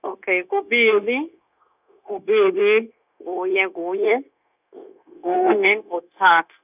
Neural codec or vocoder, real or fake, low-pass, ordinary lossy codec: codec, 16 kHz, 0.9 kbps, LongCat-Audio-Codec; fake; 3.6 kHz; none